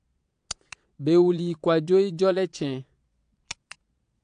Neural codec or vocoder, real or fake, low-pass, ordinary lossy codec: vocoder, 22.05 kHz, 80 mel bands, Vocos; fake; 9.9 kHz; AAC, 64 kbps